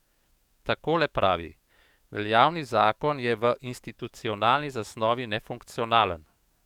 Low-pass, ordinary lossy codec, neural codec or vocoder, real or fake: 19.8 kHz; none; codec, 44.1 kHz, 7.8 kbps, DAC; fake